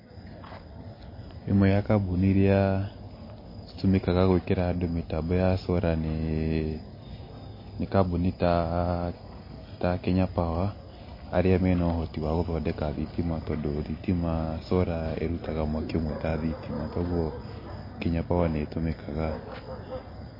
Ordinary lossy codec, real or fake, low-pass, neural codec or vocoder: MP3, 24 kbps; real; 5.4 kHz; none